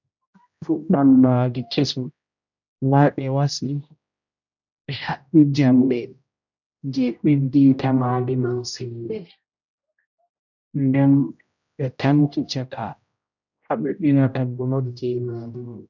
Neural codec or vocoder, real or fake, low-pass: codec, 16 kHz, 0.5 kbps, X-Codec, HuBERT features, trained on general audio; fake; 7.2 kHz